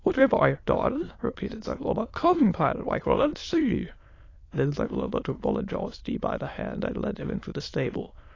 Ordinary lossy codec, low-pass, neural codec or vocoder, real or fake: AAC, 32 kbps; 7.2 kHz; autoencoder, 22.05 kHz, a latent of 192 numbers a frame, VITS, trained on many speakers; fake